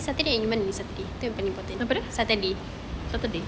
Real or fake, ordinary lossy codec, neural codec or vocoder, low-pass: real; none; none; none